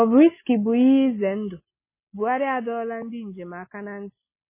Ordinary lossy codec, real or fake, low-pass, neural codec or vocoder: MP3, 16 kbps; real; 3.6 kHz; none